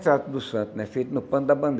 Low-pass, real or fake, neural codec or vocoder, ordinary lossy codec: none; real; none; none